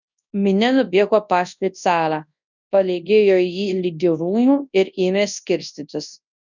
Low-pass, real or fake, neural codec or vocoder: 7.2 kHz; fake; codec, 24 kHz, 0.9 kbps, WavTokenizer, large speech release